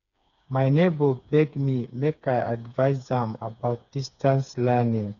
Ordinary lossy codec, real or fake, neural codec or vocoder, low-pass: none; fake; codec, 16 kHz, 4 kbps, FreqCodec, smaller model; 7.2 kHz